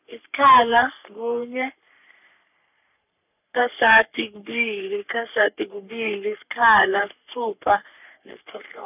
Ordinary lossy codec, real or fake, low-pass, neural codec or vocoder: none; fake; 3.6 kHz; codec, 44.1 kHz, 3.4 kbps, Pupu-Codec